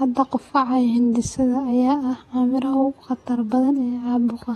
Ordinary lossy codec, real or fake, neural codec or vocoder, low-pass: AAC, 32 kbps; fake; vocoder, 48 kHz, 128 mel bands, Vocos; 19.8 kHz